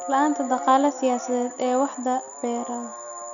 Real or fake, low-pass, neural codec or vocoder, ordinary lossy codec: real; 7.2 kHz; none; none